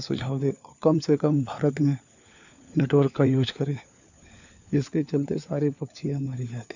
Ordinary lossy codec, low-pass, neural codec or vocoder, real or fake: none; 7.2 kHz; codec, 16 kHz, 4 kbps, FunCodec, trained on LibriTTS, 50 frames a second; fake